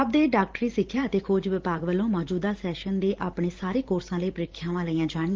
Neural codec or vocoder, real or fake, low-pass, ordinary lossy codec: vocoder, 44.1 kHz, 128 mel bands every 512 samples, BigVGAN v2; fake; 7.2 kHz; Opus, 16 kbps